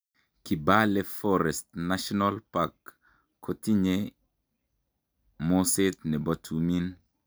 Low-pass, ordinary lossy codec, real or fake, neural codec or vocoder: none; none; real; none